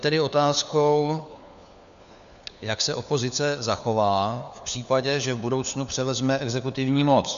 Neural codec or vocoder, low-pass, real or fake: codec, 16 kHz, 4 kbps, FunCodec, trained on LibriTTS, 50 frames a second; 7.2 kHz; fake